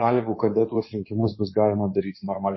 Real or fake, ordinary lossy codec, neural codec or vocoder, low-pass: fake; MP3, 24 kbps; codec, 16 kHz in and 24 kHz out, 2.2 kbps, FireRedTTS-2 codec; 7.2 kHz